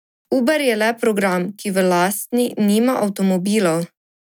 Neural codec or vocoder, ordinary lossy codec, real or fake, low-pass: none; none; real; 19.8 kHz